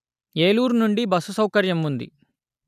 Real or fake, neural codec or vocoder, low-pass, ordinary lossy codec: real; none; 14.4 kHz; none